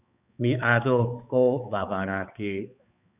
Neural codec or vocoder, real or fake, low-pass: codec, 16 kHz, 4 kbps, X-Codec, HuBERT features, trained on balanced general audio; fake; 3.6 kHz